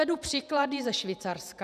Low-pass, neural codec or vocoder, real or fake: 14.4 kHz; vocoder, 48 kHz, 128 mel bands, Vocos; fake